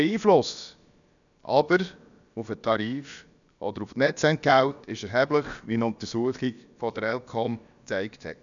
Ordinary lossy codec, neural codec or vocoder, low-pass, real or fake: none; codec, 16 kHz, about 1 kbps, DyCAST, with the encoder's durations; 7.2 kHz; fake